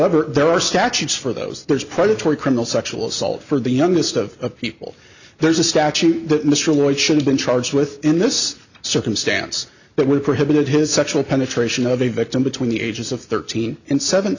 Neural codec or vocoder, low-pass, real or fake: none; 7.2 kHz; real